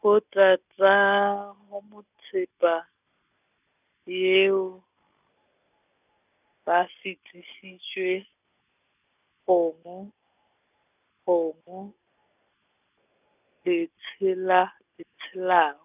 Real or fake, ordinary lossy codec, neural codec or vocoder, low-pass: real; none; none; 3.6 kHz